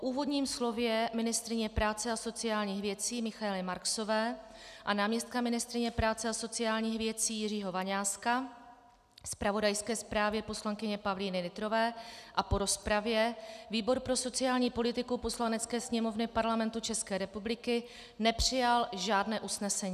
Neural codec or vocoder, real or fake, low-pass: none; real; 14.4 kHz